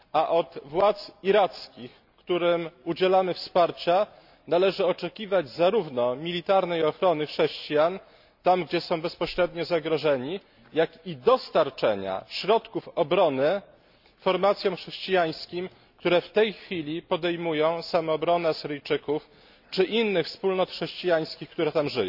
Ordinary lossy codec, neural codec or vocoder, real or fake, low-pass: none; none; real; 5.4 kHz